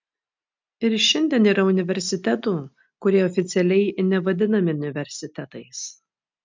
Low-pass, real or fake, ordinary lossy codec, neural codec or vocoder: 7.2 kHz; real; MP3, 64 kbps; none